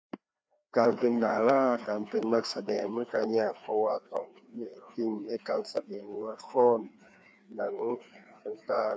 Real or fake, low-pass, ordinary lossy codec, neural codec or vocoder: fake; none; none; codec, 16 kHz, 2 kbps, FreqCodec, larger model